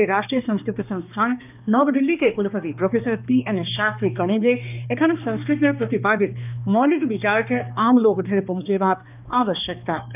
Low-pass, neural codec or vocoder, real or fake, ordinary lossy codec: 3.6 kHz; codec, 16 kHz, 2 kbps, X-Codec, HuBERT features, trained on balanced general audio; fake; none